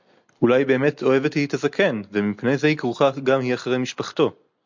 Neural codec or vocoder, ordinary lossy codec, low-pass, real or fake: none; MP3, 64 kbps; 7.2 kHz; real